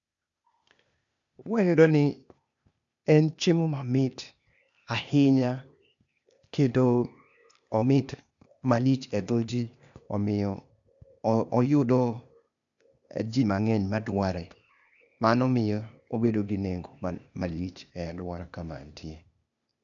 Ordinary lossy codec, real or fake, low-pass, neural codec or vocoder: none; fake; 7.2 kHz; codec, 16 kHz, 0.8 kbps, ZipCodec